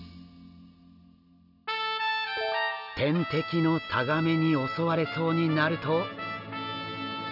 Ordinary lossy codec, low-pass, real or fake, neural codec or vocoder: none; 5.4 kHz; real; none